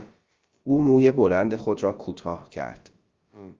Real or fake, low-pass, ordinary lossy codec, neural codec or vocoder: fake; 7.2 kHz; Opus, 32 kbps; codec, 16 kHz, about 1 kbps, DyCAST, with the encoder's durations